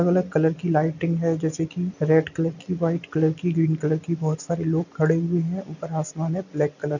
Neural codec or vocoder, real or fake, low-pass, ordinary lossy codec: none; real; 7.2 kHz; none